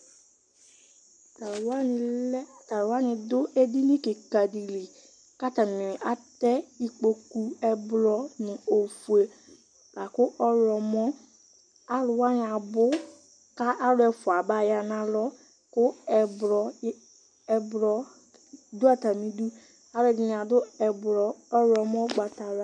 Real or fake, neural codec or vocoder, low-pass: real; none; 9.9 kHz